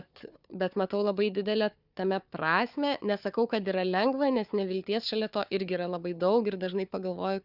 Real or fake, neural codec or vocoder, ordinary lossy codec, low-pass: fake; codec, 16 kHz, 4 kbps, FunCodec, trained on Chinese and English, 50 frames a second; Opus, 64 kbps; 5.4 kHz